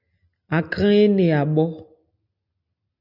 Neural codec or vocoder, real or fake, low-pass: none; real; 5.4 kHz